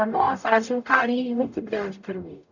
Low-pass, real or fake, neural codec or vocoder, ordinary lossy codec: 7.2 kHz; fake; codec, 44.1 kHz, 0.9 kbps, DAC; none